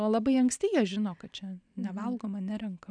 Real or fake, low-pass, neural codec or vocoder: real; 9.9 kHz; none